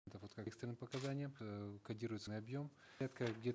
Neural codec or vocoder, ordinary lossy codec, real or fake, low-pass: none; none; real; none